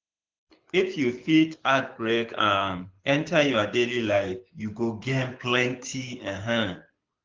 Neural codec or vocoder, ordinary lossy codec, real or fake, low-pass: codec, 24 kHz, 6 kbps, HILCodec; Opus, 32 kbps; fake; 7.2 kHz